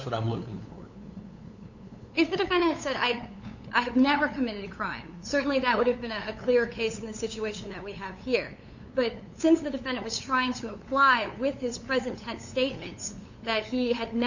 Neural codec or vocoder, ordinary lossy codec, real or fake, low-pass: codec, 16 kHz, 8 kbps, FunCodec, trained on LibriTTS, 25 frames a second; Opus, 64 kbps; fake; 7.2 kHz